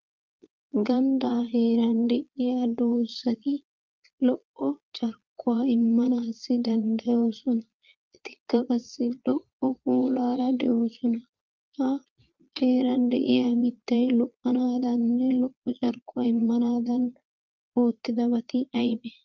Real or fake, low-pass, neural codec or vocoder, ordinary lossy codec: fake; 7.2 kHz; vocoder, 22.05 kHz, 80 mel bands, Vocos; Opus, 32 kbps